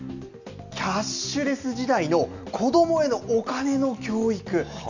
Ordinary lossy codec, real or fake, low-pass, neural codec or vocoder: none; real; 7.2 kHz; none